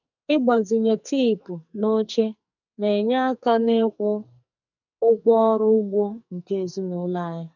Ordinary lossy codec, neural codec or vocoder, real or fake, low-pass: none; codec, 44.1 kHz, 2.6 kbps, SNAC; fake; 7.2 kHz